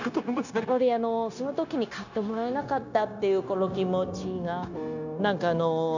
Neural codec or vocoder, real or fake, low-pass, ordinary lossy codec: codec, 16 kHz, 0.9 kbps, LongCat-Audio-Codec; fake; 7.2 kHz; none